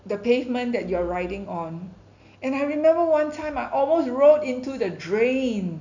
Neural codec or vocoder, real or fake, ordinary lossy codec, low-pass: none; real; none; 7.2 kHz